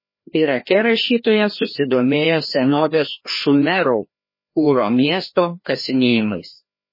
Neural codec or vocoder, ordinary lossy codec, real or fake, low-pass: codec, 16 kHz, 1 kbps, FreqCodec, larger model; MP3, 24 kbps; fake; 5.4 kHz